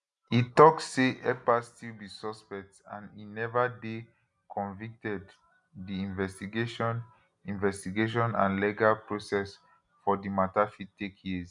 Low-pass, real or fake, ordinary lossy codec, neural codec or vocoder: 10.8 kHz; real; none; none